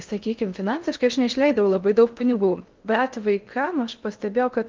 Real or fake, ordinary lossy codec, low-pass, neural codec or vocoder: fake; Opus, 32 kbps; 7.2 kHz; codec, 16 kHz in and 24 kHz out, 0.6 kbps, FocalCodec, streaming, 4096 codes